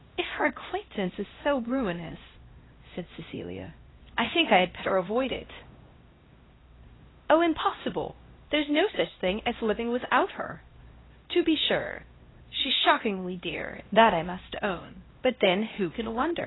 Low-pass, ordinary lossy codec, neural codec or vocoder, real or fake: 7.2 kHz; AAC, 16 kbps; codec, 16 kHz, 1 kbps, X-Codec, HuBERT features, trained on LibriSpeech; fake